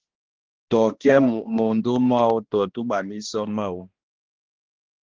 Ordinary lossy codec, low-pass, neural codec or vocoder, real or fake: Opus, 16 kbps; 7.2 kHz; codec, 16 kHz, 1 kbps, X-Codec, HuBERT features, trained on balanced general audio; fake